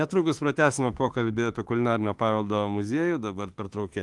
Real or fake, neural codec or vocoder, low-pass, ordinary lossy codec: fake; autoencoder, 48 kHz, 32 numbers a frame, DAC-VAE, trained on Japanese speech; 10.8 kHz; Opus, 24 kbps